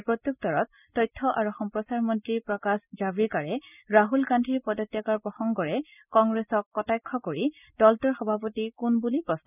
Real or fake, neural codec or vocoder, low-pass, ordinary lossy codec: real; none; 3.6 kHz; none